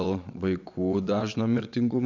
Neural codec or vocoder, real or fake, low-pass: vocoder, 22.05 kHz, 80 mel bands, WaveNeXt; fake; 7.2 kHz